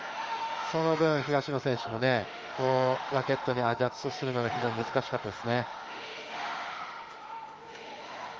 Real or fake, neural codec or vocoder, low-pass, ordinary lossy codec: fake; autoencoder, 48 kHz, 32 numbers a frame, DAC-VAE, trained on Japanese speech; 7.2 kHz; Opus, 32 kbps